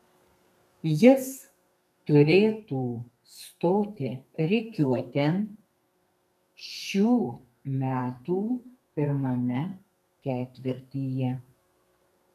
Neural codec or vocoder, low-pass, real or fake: codec, 32 kHz, 1.9 kbps, SNAC; 14.4 kHz; fake